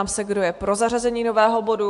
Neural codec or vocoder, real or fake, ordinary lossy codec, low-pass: none; real; AAC, 64 kbps; 10.8 kHz